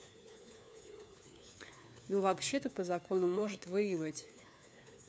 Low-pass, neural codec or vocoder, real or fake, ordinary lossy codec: none; codec, 16 kHz, 4 kbps, FunCodec, trained on LibriTTS, 50 frames a second; fake; none